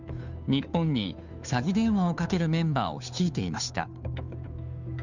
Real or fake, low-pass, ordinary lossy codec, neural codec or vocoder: fake; 7.2 kHz; none; codec, 16 kHz, 2 kbps, FunCodec, trained on Chinese and English, 25 frames a second